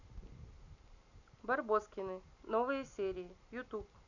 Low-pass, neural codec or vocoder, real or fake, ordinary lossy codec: 7.2 kHz; none; real; none